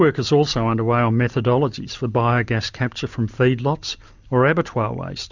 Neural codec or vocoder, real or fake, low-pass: none; real; 7.2 kHz